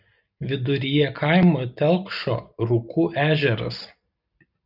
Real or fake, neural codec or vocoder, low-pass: real; none; 5.4 kHz